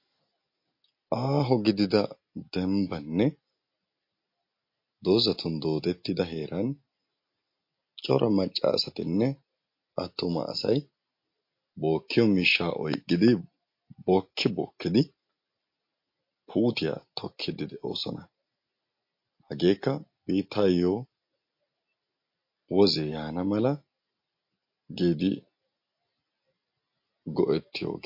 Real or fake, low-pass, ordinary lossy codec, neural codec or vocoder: real; 5.4 kHz; MP3, 32 kbps; none